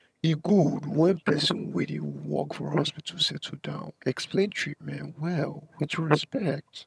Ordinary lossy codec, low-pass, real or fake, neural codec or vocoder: none; none; fake; vocoder, 22.05 kHz, 80 mel bands, HiFi-GAN